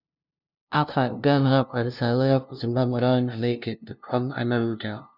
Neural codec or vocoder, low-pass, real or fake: codec, 16 kHz, 0.5 kbps, FunCodec, trained on LibriTTS, 25 frames a second; 5.4 kHz; fake